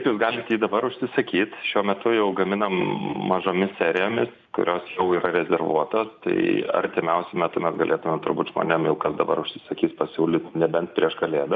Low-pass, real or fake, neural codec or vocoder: 7.2 kHz; real; none